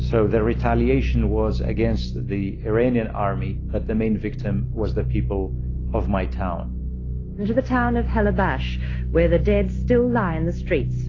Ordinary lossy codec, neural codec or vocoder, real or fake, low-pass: AAC, 32 kbps; none; real; 7.2 kHz